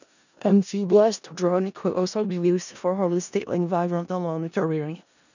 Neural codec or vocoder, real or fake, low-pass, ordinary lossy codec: codec, 16 kHz in and 24 kHz out, 0.4 kbps, LongCat-Audio-Codec, four codebook decoder; fake; 7.2 kHz; none